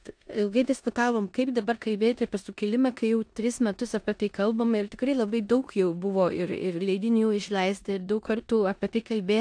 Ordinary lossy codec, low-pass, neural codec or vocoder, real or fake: AAC, 64 kbps; 9.9 kHz; codec, 16 kHz in and 24 kHz out, 0.9 kbps, LongCat-Audio-Codec, four codebook decoder; fake